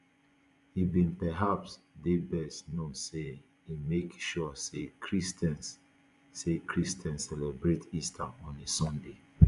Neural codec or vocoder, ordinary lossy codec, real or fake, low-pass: vocoder, 24 kHz, 100 mel bands, Vocos; none; fake; 10.8 kHz